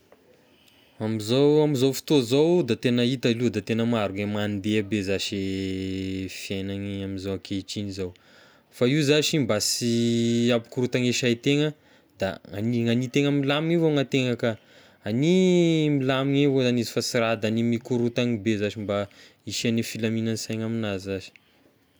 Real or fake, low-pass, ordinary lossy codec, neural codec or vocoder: real; none; none; none